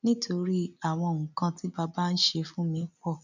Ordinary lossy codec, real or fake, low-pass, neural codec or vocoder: none; real; 7.2 kHz; none